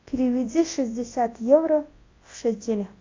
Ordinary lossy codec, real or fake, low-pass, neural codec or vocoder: AAC, 32 kbps; fake; 7.2 kHz; codec, 24 kHz, 0.9 kbps, WavTokenizer, large speech release